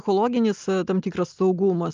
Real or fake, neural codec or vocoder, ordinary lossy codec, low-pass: real; none; Opus, 24 kbps; 7.2 kHz